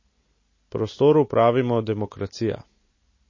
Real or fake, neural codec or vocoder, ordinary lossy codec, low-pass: real; none; MP3, 32 kbps; 7.2 kHz